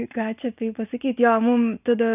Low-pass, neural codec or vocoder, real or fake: 3.6 kHz; none; real